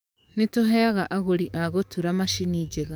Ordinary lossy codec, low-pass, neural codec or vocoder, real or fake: none; none; codec, 44.1 kHz, 7.8 kbps, DAC; fake